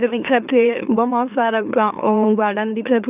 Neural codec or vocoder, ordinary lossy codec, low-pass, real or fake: autoencoder, 44.1 kHz, a latent of 192 numbers a frame, MeloTTS; none; 3.6 kHz; fake